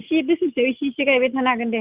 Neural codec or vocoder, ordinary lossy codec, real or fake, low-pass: none; Opus, 64 kbps; real; 3.6 kHz